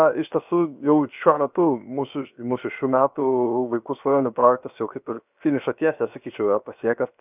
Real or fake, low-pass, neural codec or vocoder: fake; 3.6 kHz; codec, 16 kHz, 0.7 kbps, FocalCodec